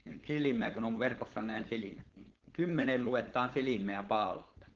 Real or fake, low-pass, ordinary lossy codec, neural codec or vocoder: fake; 7.2 kHz; Opus, 16 kbps; codec, 16 kHz, 4.8 kbps, FACodec